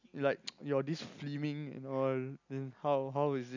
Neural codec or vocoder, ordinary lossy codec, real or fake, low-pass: none; none; real; 7.2 kHz